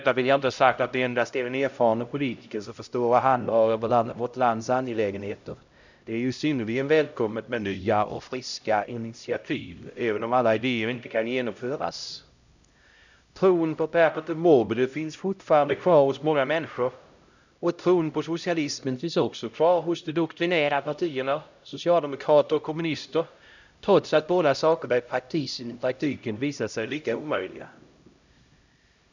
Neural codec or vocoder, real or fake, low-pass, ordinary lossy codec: codec, 16 kHz, 0.5 kbps, X-Codec, HuBERT features, trained on LibriSpeech; fake; 7.2 kHz; none